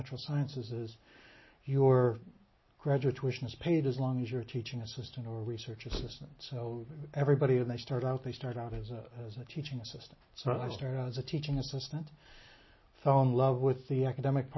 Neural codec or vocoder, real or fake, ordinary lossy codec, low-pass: none; real; MP3, 24 kbps; 7.2 kHz